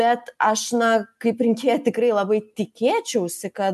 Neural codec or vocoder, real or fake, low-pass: none; real; 14.4 kHz